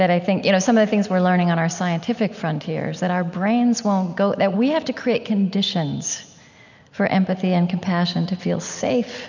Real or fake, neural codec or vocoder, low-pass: real; none; 7.2 kHz